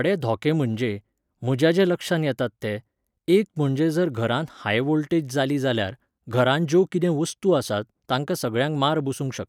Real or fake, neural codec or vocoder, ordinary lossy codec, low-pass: real; none; none; 19.8 kHz